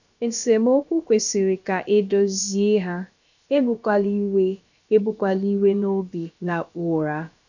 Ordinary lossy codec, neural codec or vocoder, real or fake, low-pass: none; codec, 16 kHz, about 1 kbps, DyCAST, with the encoder's durations; fake; 7.2 kHz